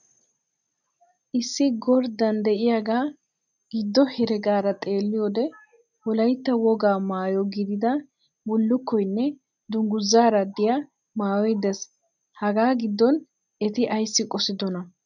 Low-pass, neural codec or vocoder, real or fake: 7.2 kHz; none; real